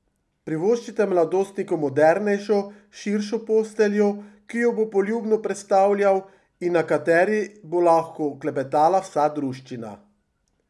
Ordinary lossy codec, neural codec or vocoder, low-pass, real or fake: none; none; none; real